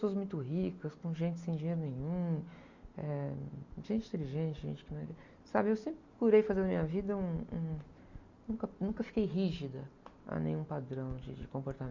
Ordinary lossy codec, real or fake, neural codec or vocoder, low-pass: Opus, 64 kbps; real; none; 7.2 kHz